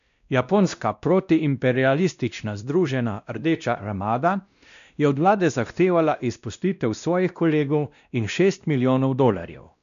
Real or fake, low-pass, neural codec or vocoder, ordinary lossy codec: fake; 7.2 kHz; codec, 16 kHz, 1 kbps, X-Codec, WavLM features, trained on Multilingual LibriSpeech; none